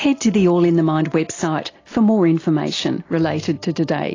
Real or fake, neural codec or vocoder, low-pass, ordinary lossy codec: real; none; 7.2 kHz; AAC, 32 kbps